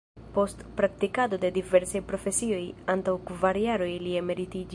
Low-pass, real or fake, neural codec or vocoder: 10.8 kHz; real; none